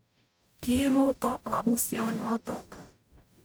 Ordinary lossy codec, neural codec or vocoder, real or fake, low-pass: none; codec, 44.1 kHz, 0.9 kbps, DAC; fake; none